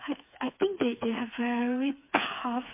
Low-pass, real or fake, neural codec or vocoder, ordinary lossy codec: 3.6 kHz; fake; codec, 16 kHz, 4 kbps, FreqCodec, smaller model; MP3, 24 kbps